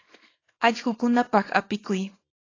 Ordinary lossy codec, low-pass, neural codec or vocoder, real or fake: AAC, 32 kbps; 7.2 kHz; codec, 24 kHz, 0.9 kbps, WavTokenizer, small release; fake